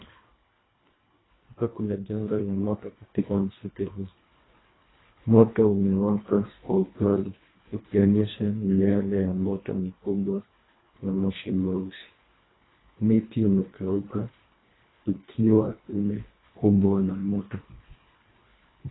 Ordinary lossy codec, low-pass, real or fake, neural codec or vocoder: AAC, 16 kbps; 7.2 kHz; fake; codec, 24 kHz, 1.5 kbps, HILCodec